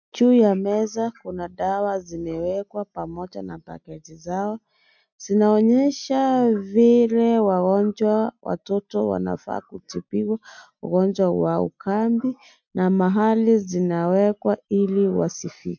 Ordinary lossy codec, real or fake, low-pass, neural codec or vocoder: MP3, 64 kbps; real; 7.2 kHz; none